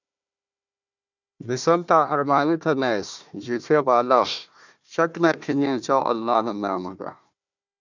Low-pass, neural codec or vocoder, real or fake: 7.2 kHz; codec, 16 kHz, 1 kbps, FunCodec, trained on Chinese and English, 50 frames a second; fake